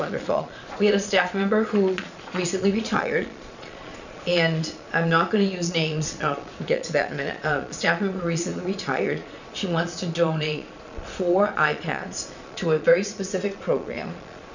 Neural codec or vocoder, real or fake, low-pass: vocoder, 22.05 kHz, 80 mel bands, Vocos; fake; 7.2 kHz